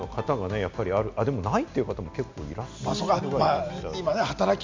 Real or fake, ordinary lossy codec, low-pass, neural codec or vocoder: real; MP3, 64 kbps; 7.2 kHz; none